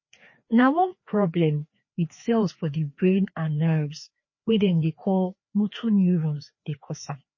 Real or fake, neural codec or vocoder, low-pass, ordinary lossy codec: fake; codec, 16 kHz, 2 kbps, FreqCodec, larger model; 7.2 kHz; MP3, 32 kbps